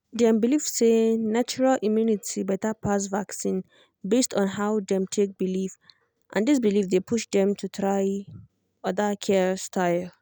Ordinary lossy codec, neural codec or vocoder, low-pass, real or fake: none; none; none; real